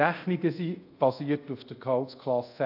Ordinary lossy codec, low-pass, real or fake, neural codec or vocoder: AAC, 48 kbps; 5.4 kHz; fake; codec, 24 kHz, 0.5 kbps, DualCodec